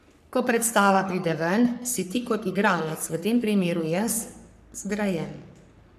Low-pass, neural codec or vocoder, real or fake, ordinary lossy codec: 14.4 kHz; codec, 44.1 kHz, 3.4 kbps, Pupu-Codec; fake; none